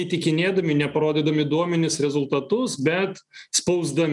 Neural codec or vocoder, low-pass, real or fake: none; 10.8 kHz; real